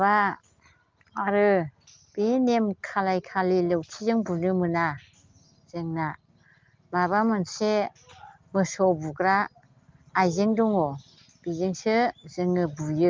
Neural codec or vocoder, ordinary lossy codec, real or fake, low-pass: none; Opus, 24 kbps; real; 7.2 kHz